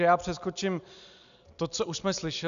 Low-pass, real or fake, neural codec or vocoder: 7.2 kHz; real; none